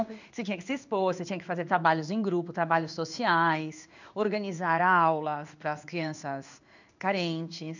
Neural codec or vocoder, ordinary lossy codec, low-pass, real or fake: codec, 16 kHz in and 24 kHz out, 1 kbps, XY-Tokenizer; none; 7.2 kHz; fake